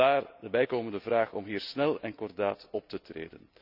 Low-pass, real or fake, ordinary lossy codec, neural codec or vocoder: 5.4 kHz; real; none; none